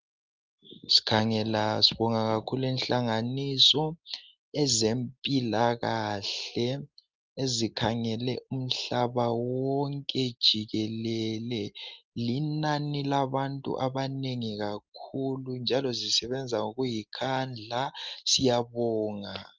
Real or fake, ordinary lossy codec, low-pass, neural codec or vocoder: real; Opus, 32 kbps; 7.2 kHz; none